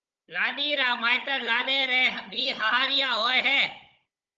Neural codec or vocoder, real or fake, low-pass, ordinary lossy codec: codec, 16 kHz, 16 kbps, FunCodec, trained on Chinese and English, 50 frames a second; fake; 7.2 kHz; Opus, 24 kbps